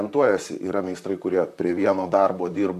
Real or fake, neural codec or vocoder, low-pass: fake; vocoder, 44.1 kHz, 128 mel bands, Pupu-Vocoder; 14.4 kHz